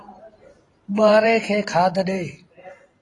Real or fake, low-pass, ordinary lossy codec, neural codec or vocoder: fake; 10.8 kHz; AAC, 32 kbps; vocoder, 44.1 kHz, 128 mel bands every 512 samples, BigVGAN v2